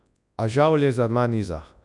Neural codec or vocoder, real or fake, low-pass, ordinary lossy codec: codec, 24 kHz, 0.9 kbps, WavTokenizer, large speech release; fake; 10.8 kHz; none